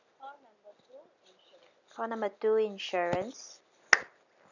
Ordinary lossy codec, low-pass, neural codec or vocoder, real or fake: none; 7.2 kHz; none; real